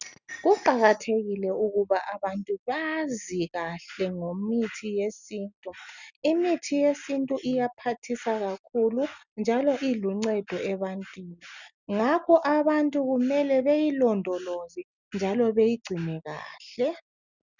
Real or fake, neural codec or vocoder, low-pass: real; none; 7.2 kHz